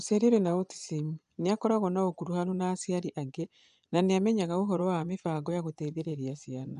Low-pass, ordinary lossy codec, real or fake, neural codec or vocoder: 10.8 kHz; none; fake; vocoder, 24 kHz, 100 mel bands, Vocos